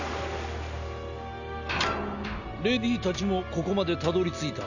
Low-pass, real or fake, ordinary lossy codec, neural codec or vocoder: 7.2 kHz; real; none; none